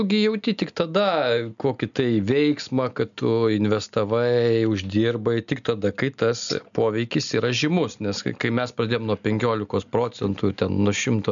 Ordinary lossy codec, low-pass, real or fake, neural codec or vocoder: MP3, 96 kbps; 7.2 kHz; real; none